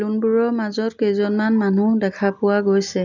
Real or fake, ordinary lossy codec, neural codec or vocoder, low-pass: real; none; none; 7.2 kHz